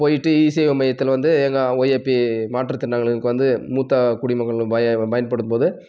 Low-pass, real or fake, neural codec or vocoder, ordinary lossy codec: none; real; none; none